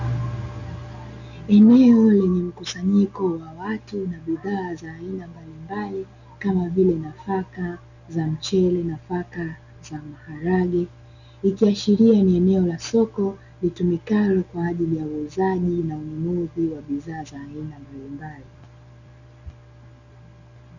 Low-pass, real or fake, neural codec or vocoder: 7.2 kHz; real; none